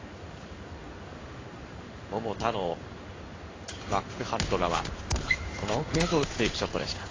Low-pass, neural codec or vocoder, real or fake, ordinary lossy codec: 7.2 kHz; codec, 16 kHz in and 24 kHz out, 1 kbps, XY-Tokenizer; fake; AAC, 32 kbps